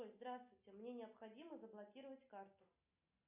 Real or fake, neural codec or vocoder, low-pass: real; none; 3.6 kHz